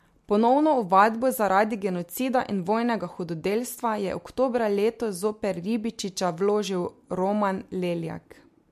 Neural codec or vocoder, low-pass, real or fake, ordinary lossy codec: none; 14.4 kHz; real; MP3, 64 kbps